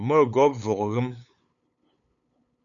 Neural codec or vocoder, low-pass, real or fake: codec, 16 kHz, 8 kbps, FunCodec, trained on LibriTTS, 25 frames a second; 7.2 kHz; fake